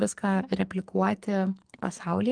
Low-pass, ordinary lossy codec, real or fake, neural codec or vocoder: 9.9 kHz; Opus, 32 kbps; fake; codec, 44.1 kHz, 2.6 kbps, SNAC